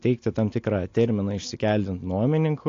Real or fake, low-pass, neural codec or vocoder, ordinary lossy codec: real; 7.2 kHz; none; AAC, 48 kbps